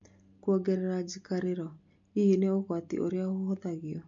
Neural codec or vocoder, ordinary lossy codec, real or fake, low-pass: none; MP3, 48 kbps; real; 7.2 kHz